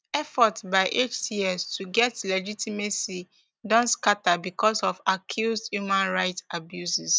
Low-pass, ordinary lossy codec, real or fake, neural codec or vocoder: none; none; real; none